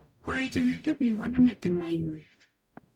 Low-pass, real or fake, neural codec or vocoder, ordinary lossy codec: 19.8 kHz; fake; codec, 44.1 kHz, 0.9 kbps, DAC; none